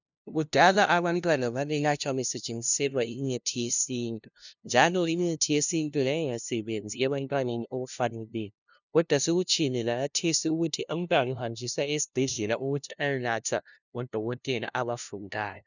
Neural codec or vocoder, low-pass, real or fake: codec, 16 kHz, 0.5 kbps, FunCodec, trained on LibriTTS, 25 frames a second; 7.2 kHz; fake